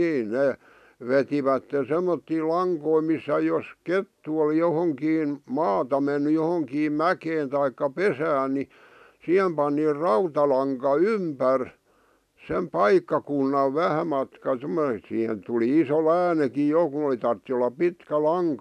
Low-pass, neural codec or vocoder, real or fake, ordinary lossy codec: 14.4 kHz; none; real; none